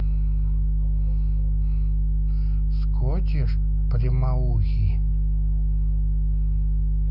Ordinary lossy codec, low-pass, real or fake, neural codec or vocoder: none; 5.4 kHz; real; none